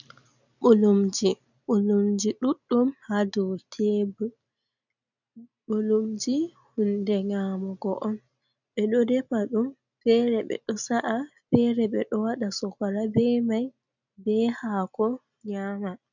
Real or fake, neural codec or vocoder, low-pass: real; none; 7.2 kHz